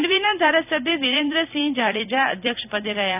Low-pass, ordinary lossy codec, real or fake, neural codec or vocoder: 3.6 kHz; none; fake; vocoder, 44.1 kHz, 128 mel bands every 256 samples, BigVGAN v2